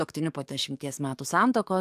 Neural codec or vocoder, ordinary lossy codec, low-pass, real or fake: codec, 44.1 kHz, 7.8 kbps, DAC; AAC, 96 kbps; 14.4 kHz; fake